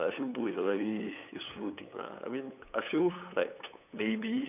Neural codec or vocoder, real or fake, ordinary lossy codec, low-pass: codec, 16 kHz, 8 kbps, FunCodec, trained on LibriTTS, 25 frames a second; fake; none; 3.6 kHz